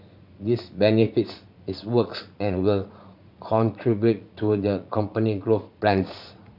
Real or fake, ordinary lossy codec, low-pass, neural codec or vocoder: fake; none; 5.4 kHz; vocoder, 44.1 kHz, 80 mel bands, Vocos